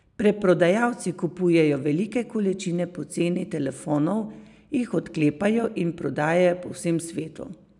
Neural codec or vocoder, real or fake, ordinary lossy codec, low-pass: none; real; none; 10.8 kHz